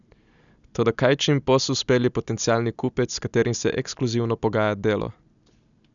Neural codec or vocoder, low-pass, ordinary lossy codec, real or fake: none; 7.2 kHz; none; real